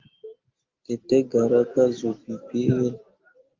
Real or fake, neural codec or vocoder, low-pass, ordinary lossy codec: real; none; 7.2 kHz; Opus, 32 kbps